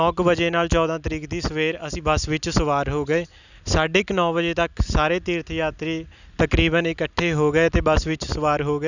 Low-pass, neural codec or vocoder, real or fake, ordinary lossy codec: 7.2 kHz; none; real; none